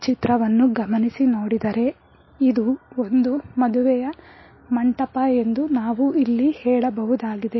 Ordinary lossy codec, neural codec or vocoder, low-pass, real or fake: MP3, 24 kbps; none; 7.2 kHz; real